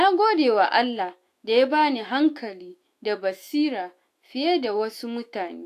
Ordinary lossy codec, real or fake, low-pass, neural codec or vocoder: AAC, 64 kbps; fake; 14.4 kHz; autoencoder, 48 kHz, 128 numbers a frame, DAC-VAE, trained on Japanese speech